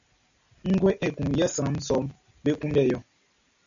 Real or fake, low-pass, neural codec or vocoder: real; 7.2 kHz; none